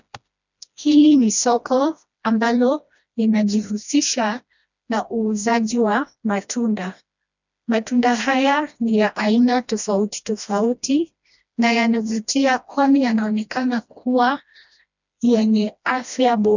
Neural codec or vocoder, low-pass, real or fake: codec, 16 kHz, 1 kbps, FreqCodec, smaller model; 7.2 kHz; fake